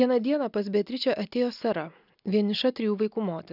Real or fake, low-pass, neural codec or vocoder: real; 5.4 kHz; none